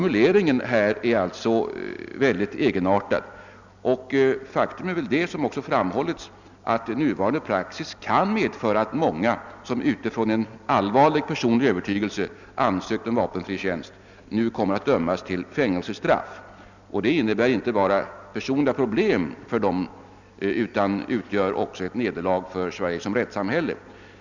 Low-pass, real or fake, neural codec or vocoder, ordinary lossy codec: 7.2 kHz; real; none; none